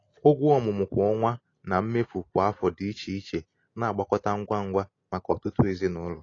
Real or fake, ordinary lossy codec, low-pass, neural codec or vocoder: real; AAC, 32 kbps; 7.2 kHz; none